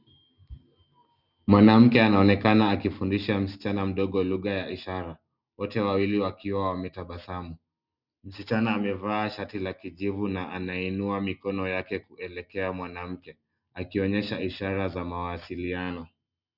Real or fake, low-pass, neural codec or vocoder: real; 5.4 kHz; none